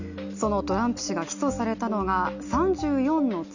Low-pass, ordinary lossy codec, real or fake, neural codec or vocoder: 7.2 kHz; none; real; none